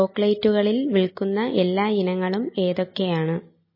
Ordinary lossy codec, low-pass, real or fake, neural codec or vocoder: MP3, 24 kbps; 5.4 kHz; real; none